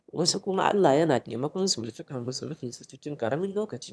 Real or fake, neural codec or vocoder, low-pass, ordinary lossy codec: fake; autoencoder, 22.05 kHz, a latent of 192 numbers a frame, VITS, trained on one speaker; none; none